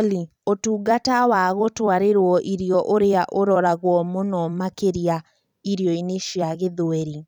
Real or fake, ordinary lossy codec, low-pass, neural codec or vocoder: fake; none; 19.8 kHz; vocoder, 44.1 kHz, 128 mel bands every 256 samples, BigVGAN v2